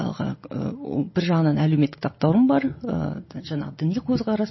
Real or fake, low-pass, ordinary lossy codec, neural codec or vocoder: real; 7.2 kHz; MP3, 24 kbps; none